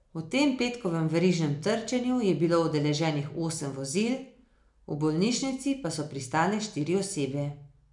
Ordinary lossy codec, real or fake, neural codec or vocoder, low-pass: none; real; none; 10.8 kHz